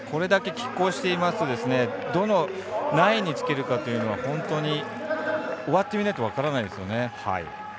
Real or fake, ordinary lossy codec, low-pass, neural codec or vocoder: real; none; none; none